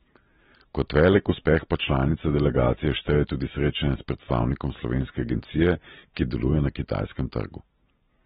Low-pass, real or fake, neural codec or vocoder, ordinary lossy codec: 7.2 kHz; real; none; AAC, 16 kbps